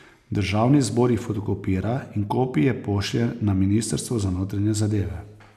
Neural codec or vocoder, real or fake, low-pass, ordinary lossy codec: none; real; 14.4 kHz; none